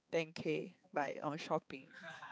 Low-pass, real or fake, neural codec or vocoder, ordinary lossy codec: none; fake; codec, 16 kHz, 4 kbps, X-Codec, HuBERT features, trained on balanced general audio; none